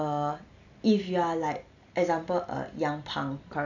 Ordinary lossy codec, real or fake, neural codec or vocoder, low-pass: none; real; none; 7.2 kHz